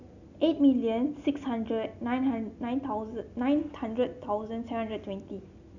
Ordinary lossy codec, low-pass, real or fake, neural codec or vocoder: none; 7.2 kHz; real; none